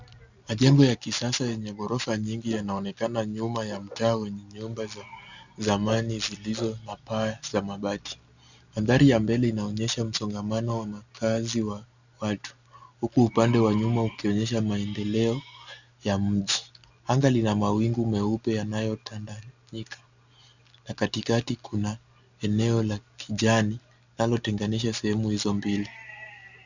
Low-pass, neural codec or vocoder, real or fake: 7.2 kHz; none; real